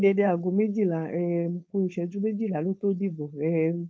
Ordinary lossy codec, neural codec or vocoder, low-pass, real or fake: none; codec, 16 kHz, 4.8 kbps, FACodec; none; fake